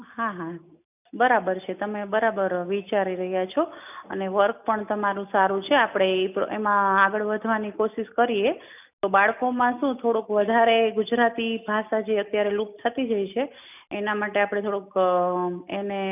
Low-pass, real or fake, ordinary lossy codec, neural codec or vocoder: 3.6 kHz; real; none; none